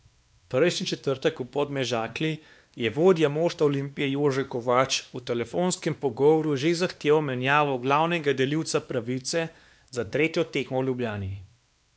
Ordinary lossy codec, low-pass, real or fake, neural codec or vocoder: none; none; fake; codec, 16 kHz, 2 kbps, X-Codec, WavLM features, trained on Multilingual LibriSpeech